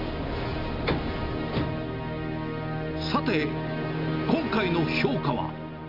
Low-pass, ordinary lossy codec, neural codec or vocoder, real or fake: 5.4 kHz; none; none; real